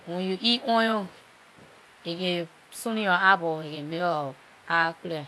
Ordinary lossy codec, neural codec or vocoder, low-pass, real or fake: none; vocoder, 24 kHz, 100 mel bands, Vocos; none; fake